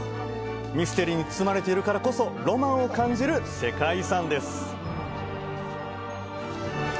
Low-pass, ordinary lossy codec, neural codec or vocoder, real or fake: none; none; none; real